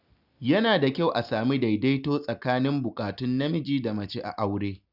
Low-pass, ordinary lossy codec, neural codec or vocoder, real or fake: 5.4 kHz; none; none; real